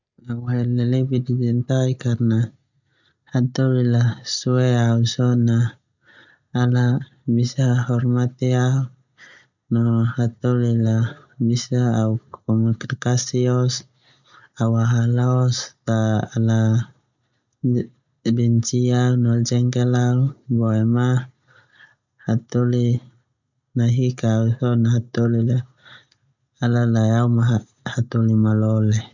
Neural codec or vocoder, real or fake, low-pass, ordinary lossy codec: none; real; 7.2 kHz; none